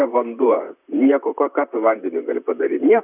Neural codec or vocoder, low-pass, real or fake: vocoder, 44.1 kHz, 128 mel bands, Pupu-Vocoder; 3.6 kHz; fake